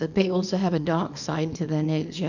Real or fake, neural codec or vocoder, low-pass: fake; codec, 24 kHz, 0.9 kbps, WavTokenizer, small release; 7.2 kHz